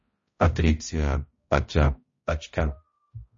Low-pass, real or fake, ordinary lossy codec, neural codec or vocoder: 7.2 kHz; fake; MP3, 32 kbps; codec, 16 kHz, 0.5 kbps, X-Codec, HuBERT features, trained on balanced general audio